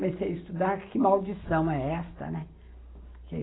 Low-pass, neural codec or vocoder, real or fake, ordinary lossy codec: 7.2 kHz; none; real; AAC, 16 kbps